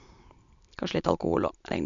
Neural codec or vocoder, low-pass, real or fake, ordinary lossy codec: none; 7.2 kHz; real; AAC, 64 kbps